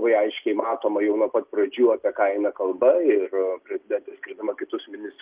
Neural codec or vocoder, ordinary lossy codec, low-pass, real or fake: none; Opus, 24 kbps; 3.6 kHz; real